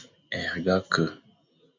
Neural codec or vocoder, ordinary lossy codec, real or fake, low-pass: none; MP3, 48 kbps; real; 7.2 kHz